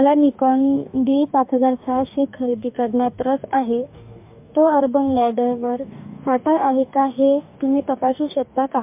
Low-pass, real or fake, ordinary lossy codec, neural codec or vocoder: 3.6 kHz; fake; MP3, 32 kbps; codec, 44.1 kHz, 2.6 kbps, DAC